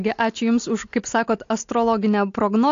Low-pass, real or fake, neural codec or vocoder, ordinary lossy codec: 7.2 kHz; real; none; AAC, 48 kbps